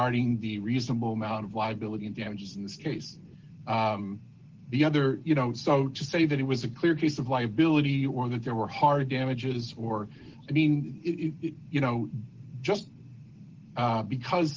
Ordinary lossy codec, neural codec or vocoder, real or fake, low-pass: Opus, 16 kbps; none; real; 7.2 kHz